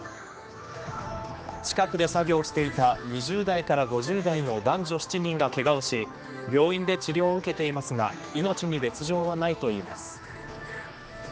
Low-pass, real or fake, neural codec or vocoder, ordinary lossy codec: none; fake; codec, 16 kHz, 2 kbps, X-Codec, HuBERT features, trained on general audio; none